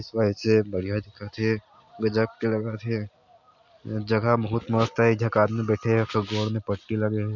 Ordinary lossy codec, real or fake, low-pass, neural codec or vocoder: none; real; 7.2 kHz; none